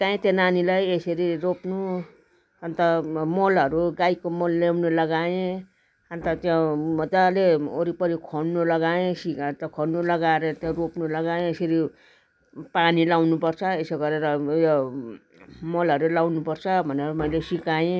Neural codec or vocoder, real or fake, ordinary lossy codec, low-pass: none; real; none; none